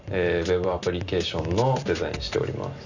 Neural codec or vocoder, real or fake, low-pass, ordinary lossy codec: none; real; 7.2 kHz; none